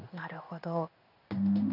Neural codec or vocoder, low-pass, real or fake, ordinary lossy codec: codec, 16 kHz in and 24 kHz out, 1 kbps, XY-Tokenizer; 5.4 kHz; fake; none